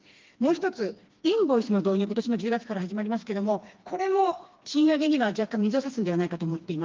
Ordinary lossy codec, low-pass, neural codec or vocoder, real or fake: Opus, 24 kbps; 7.2 kHz; codec, 16 kHz, 2 kbps, FreqCodec, smaller model; fake